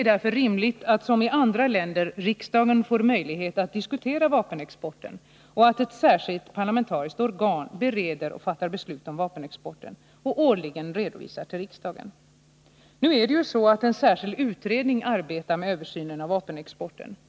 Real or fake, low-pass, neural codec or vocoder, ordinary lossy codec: real; none; none; none